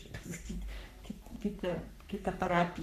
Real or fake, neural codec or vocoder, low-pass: fake; codec, 44.1 kHz, 3.4 kbps, Pupu-Codec; 14.4 kHz